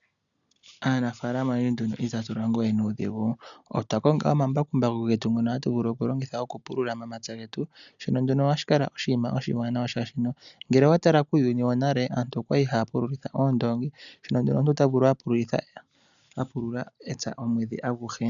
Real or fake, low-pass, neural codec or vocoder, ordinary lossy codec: real; 7.2 kHz; none; MP3, 96 kbps